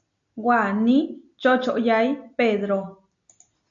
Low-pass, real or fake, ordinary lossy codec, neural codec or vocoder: 7.2 kHz; real; AAC, 64 kbps; none